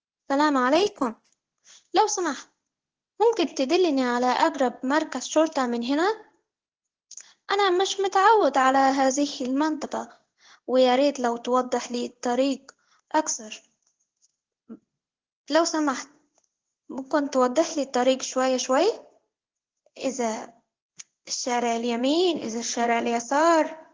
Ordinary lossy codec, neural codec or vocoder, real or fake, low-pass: Opus, 16 kbps; codec, 16 kHz in and 24 kHz out, 1 kbps, XY-Tokenizer; fake; 7.2 kHz